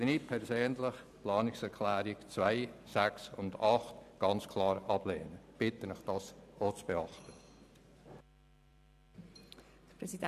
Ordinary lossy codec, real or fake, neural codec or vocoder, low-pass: none; fake; vocoder, 44.1 kHz, 128 mel bands every 512 samples, BigVGAN v2; 14.4 kHz